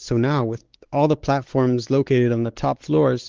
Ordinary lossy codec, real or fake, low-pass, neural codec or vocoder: Opus, 16 kbps; fake; 7.2 kHz; codec, 16 kHz, 4 kbps, X-Codec, WavLM features, trained on Multilingual LibriSpeech